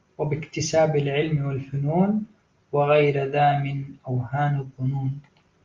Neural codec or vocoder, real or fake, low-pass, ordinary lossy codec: none; real; 7.2 kHz; Opus, 32 kbps